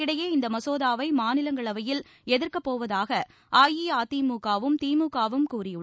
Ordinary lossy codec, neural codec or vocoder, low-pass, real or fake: none; none; none; real